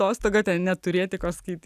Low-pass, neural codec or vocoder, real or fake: 14.4 kHz; none; real